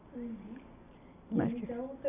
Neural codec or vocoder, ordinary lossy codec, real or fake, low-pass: none; none; real; 3.6 kHz